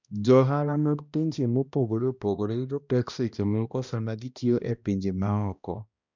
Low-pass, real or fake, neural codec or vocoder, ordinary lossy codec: 7.2 kHz; fake; codec, 16 kHz, 1 kbps, X-Codec, HuBERT features, trained on balanced general audio; none